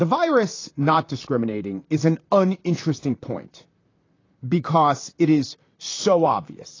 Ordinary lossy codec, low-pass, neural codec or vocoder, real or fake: AAC, 32 kbps; 7.2 kHz; vocoder, 44.1 kHz, 128 mel bands, Pupu-Vocoder; fake